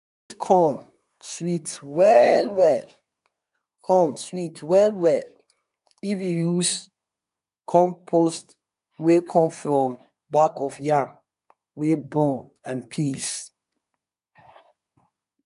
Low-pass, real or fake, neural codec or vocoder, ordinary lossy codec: 10.8 kHz; fake; codec, 24 kHz, 1 kbps, SNAC; none